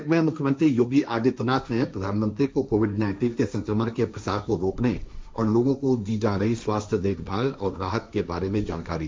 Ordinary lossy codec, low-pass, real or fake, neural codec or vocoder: none; none; fake; codec, 16 kHz, 1.1 kbps, Voila-Tokenizer